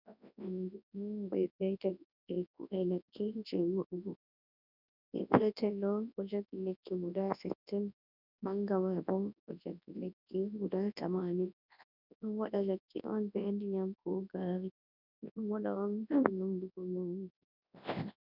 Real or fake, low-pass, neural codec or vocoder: fake; 5.4 kHz; codec, 24 kHz, 0.9 kbps, WavTokenizer, large speech release